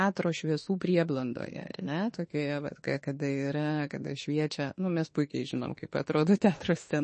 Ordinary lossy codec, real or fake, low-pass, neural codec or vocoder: MP3, 32 kbps; fake; 10.8 kHz; autoencoder, 48 kHz, 32 numbers a frame, DAC-VAE, trained on Japanese speech